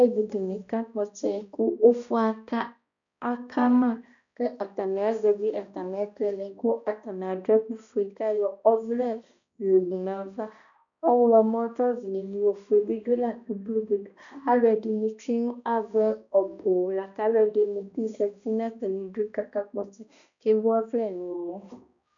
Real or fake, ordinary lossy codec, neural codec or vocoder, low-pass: fake; MP3, 96 kbps; codec, 16 kHz, 1 kbps, X-Codec, HuBERT features, trained on balanced general audio; 7.2 kHz